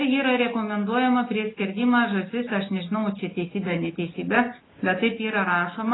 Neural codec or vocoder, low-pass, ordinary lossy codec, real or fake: none; 7.2 kHz; AAC, 16 kbps; real